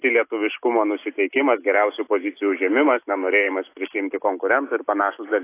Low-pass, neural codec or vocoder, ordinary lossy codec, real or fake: 3.6 kHz; none; AAC, 24 kbps; real